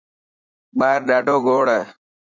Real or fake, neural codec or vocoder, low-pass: fake; vocoder, 44.1 kHz, 80 mel bands, Vocos; 7.2 kHz